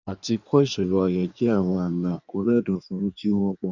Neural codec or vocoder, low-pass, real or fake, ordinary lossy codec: codec, 16 kHz in and 24 kHz out, 1.1 kbps, FireRedTTS-2 codec; 7.2 kHz; fake; none